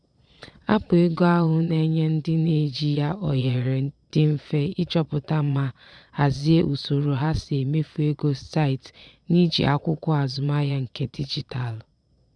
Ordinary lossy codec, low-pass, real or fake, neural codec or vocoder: none; 9.9 kHz; fake; vocoder, 22.05 kHz, 80 mel bands, Vocos